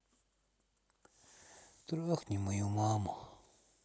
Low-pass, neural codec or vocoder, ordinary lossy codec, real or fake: none; none; none; real